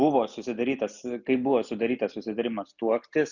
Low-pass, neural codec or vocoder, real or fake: 7.2 kHz; none; real